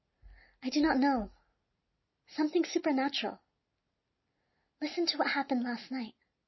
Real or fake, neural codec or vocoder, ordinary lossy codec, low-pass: real; none; MP3, 24 kbps; 7.2 kHz